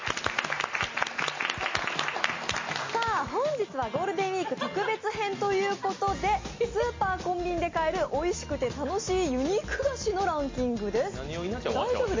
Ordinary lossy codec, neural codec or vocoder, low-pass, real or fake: MP3, 32 kbps; none; 7.2 kHz; real